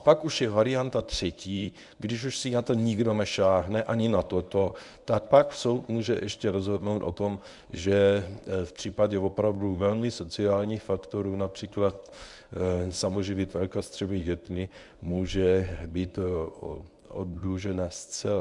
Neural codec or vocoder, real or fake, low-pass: codec, 24 kHz, 0.9 kbps, WavTokenizer, medium speech release version 1; fake; 10.8 kHz